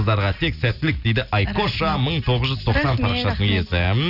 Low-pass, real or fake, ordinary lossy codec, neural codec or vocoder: 5.4 kHz; real; none; none